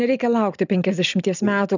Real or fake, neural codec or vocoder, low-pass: real; none; 7.2 kHz